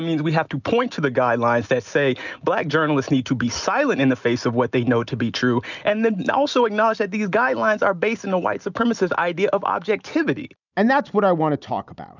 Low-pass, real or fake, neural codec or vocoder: 7.2 kHz; real; none